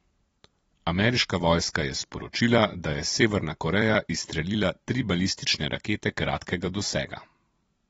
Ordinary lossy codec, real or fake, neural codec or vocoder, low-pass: AAC, 24 kbps; fake; vocoder, 44.1 kHz, 128 mel bands every 256 samples, BigVGAN v2; 19.8 kHz